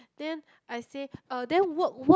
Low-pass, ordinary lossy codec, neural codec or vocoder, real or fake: none; none; none; real